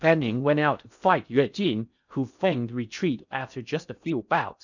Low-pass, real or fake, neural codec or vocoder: 7.2 kHz; fake; codec, 16 kHz in and 24 kHz out, 0.6 kbps, FocalCodec, streaming, 4096 codes